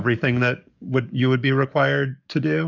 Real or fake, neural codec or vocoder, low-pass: fake; codec, 44.1 kHz, 7.8 kbps, DAC; 7.2 kHz